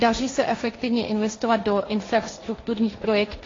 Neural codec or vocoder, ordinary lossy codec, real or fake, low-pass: codec, 16 kHz, 1.1 kbps, Voila-Tokenizer; AAC, 32 kbps; fake; 7.2 kHz